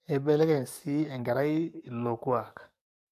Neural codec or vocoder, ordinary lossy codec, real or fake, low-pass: codec, 44.1 kHz, 7.8 kbps, DAC; AAC, 96 kbps; fake; 14.4 kHz